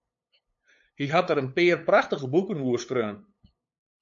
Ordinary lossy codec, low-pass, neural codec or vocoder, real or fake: MP3, 48 kbps; 7.2 kHz; codec, 16 kHz, 8 kbps, FunCodec, trained on LibriTTS, 25 frames a second; fake